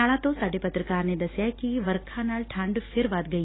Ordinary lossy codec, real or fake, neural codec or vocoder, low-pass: AAC, 16 kbps; real; none; 7.2 kHz